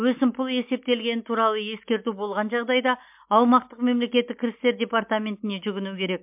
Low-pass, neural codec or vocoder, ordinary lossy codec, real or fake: 3.6 kHz; none; MP3, 32 kbps; real